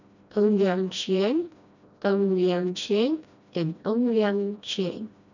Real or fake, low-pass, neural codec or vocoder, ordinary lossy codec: fake; 7.2 kHz; codec, 16 kHz, 1 kbps, FreqCodec, smaller model; none